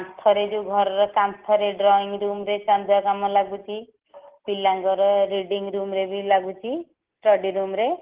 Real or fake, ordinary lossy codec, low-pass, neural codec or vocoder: real; Opus, 16 kbps; 3.6 kHz; none